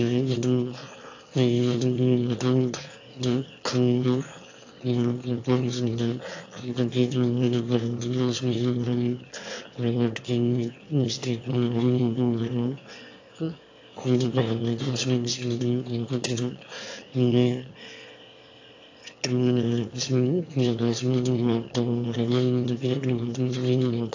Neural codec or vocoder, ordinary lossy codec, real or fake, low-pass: autoencoder, 22.05 kHz, a latent of 192 numbers a frame, VITS, trained on one speaker; AAC, 48 kbps; fake; 7.2 kHz